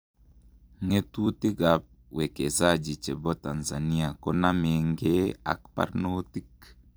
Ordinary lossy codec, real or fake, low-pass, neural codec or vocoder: none; fake; none; vocoder, 44.1 kHz, 128 mel bands every 256 samples, BigVGAN v2